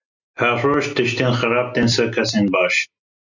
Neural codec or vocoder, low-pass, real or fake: none; 7.2 kHz; real